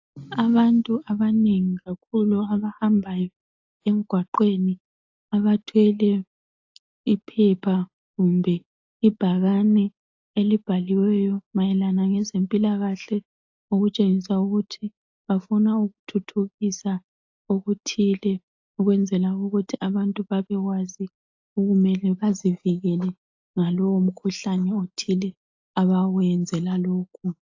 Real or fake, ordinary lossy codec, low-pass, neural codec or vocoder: real; AAC, 48 kbps; 7.2 kHz; none